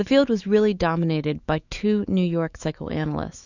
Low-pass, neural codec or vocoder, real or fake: 7.2 kHz; autoencoder, 48 kHz, 128 numbers a frame, DAC-VAE, trained on Japanese speech; fake